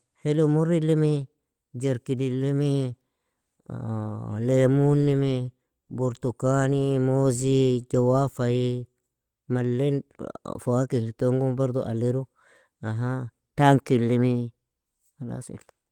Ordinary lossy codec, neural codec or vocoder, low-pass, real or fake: Opus, 24 kbps; none; 19.8 kHz; real